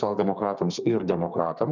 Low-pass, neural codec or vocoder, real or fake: 7.2 kHz; codec, 32 kHz, 1.9 kbps, SNAC; fake